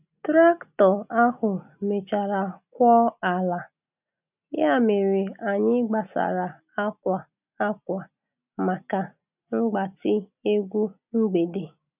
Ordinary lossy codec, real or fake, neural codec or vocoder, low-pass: none; real; none; 3.6 kHz